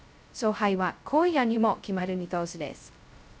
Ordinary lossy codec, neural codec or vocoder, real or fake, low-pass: none; codec, 16 kHz, 0.2 kbps, FocalCodec; fake; none